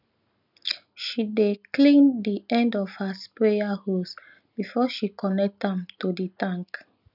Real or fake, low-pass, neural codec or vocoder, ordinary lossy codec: real; 5.4 kHz; none; none